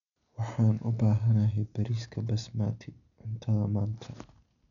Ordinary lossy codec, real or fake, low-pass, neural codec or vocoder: none; real; 7.2 kHz; none